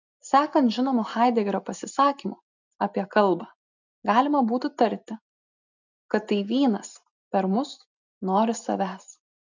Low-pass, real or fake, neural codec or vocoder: 7.2 kHz; real; none